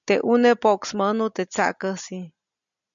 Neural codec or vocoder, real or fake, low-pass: none; real; 7.2 kHz